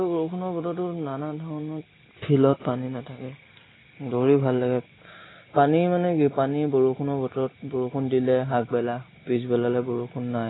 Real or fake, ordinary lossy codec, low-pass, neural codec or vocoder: real; AAC, 16 kbps; 7.2 kHz; none